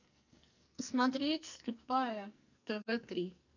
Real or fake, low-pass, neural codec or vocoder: fake; 7.2 kHz; codec, 44.1 kHz, 2.6 kbps, SNAC